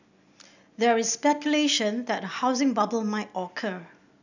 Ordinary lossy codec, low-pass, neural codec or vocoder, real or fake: none; 7.2 kHz; none; real